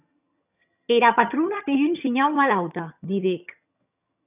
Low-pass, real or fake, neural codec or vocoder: 3.6 kHz; fake; vocoder, 22.05 kHz, 80 mel bands, HiFi-GAN